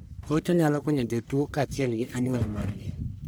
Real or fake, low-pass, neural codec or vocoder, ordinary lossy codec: fake; none; codec, 44.1 kHz, 1.7 kbps, Pupu-Codec; none